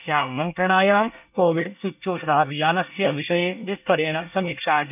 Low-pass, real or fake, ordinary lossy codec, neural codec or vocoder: 3.6 kHz; fake; none; codec, 24 kHz, 1 kbps, SNAC